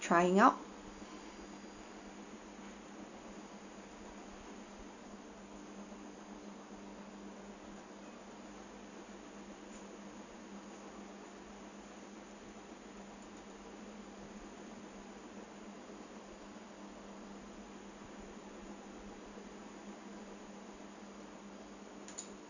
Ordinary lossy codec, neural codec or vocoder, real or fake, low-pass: none; none; real; 7.2 kHz